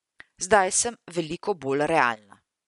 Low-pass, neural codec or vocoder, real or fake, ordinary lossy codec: 10.8 kHz; none; real; none